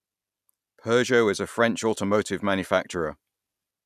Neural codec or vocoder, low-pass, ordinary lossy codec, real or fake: none; 14.4 kHz; none; real